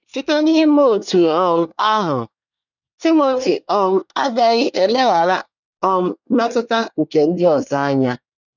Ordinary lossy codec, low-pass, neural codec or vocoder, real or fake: none; 7.2 kHz; codec, 24 kHz, 1 kbps, SNAC; fake